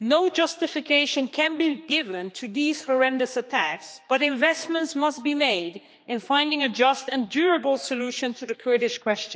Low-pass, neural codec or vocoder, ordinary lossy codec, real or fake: none; codec, 16 kHz, 2 kbps, X-Codec, HuBERT features, trained on general audio; none; fake